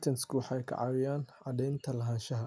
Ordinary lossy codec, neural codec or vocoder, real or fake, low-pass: none; none; real; none